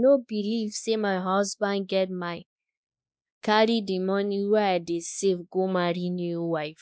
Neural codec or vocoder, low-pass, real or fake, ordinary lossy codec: codec, 16 kHz, 2 kbps, X-Codec, WavLM features, trained on Multilingual LibriSpeech; none; fake; none